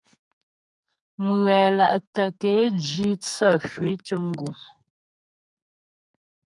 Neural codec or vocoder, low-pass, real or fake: codec, 44.1 kHz, 2.6 kbps, SNAC; 10.8 kHz; fake